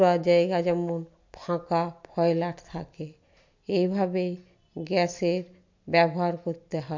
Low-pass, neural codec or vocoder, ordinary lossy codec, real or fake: 7.2 kHz; none; MP3, 48 kbps; real